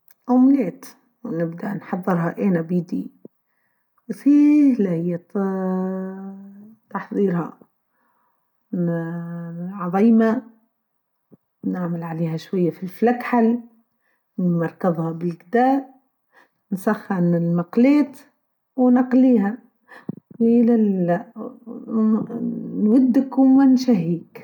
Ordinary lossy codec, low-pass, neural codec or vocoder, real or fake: none; 19.8 kHz; none; real